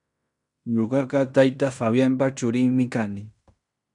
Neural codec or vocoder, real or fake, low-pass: codec, 16 kHz in and 24 kHz out, 0.9 kbps, LongCat-Audio-Codec, fine tuned four codebook decoder; fake; 10.8 kHz